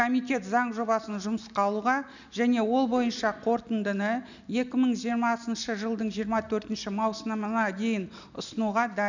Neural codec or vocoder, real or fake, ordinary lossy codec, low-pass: none; real; none; 7.2 kHz